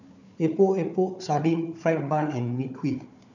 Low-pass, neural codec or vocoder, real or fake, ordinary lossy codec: 7.2 kHz; codec, 16 kHz, 4 kbps, FunCodec, trained on Chinese and English, 50 frames a second; fake; none